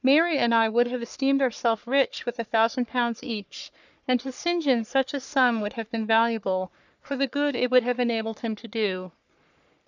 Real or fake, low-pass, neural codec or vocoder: fake; 7.2 kHz; codec, 44.1 kHz, 3.4 kbps, Pupu-Codec